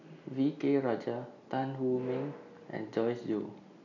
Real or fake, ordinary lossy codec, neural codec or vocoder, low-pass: real; none; none; 7.2 kHz